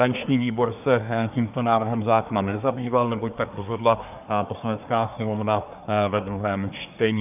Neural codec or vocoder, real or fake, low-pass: codec, 24 kHz, 1 kbps, SNAC; fake; 3.6 kHz